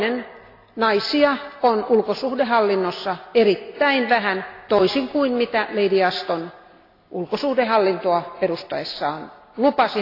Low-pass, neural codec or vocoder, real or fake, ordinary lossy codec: 5.4 kHz; none; real; AAC, 32 kbps